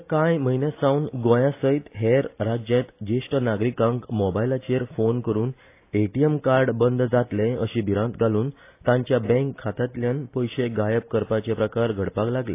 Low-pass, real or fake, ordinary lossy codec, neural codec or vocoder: 3.6 kHz; real; AAC, 24 kbps; none